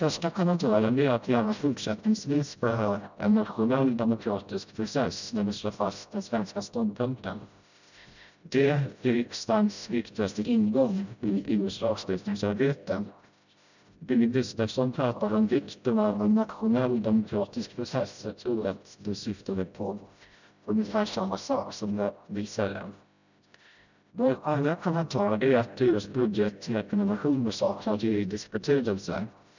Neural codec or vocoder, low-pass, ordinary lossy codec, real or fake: codec, 16 kHz, 0.5 kbps, FreqCodec, smaller model; 7.2 kHz; none; fake